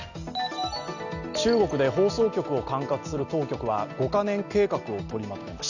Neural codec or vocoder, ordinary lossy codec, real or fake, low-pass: none; none; real; 7.2 kHz